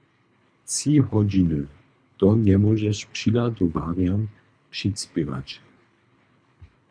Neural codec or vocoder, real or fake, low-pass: codec, 24 kHz, 3 kbps, HILCodec; fake; 9.9 kHz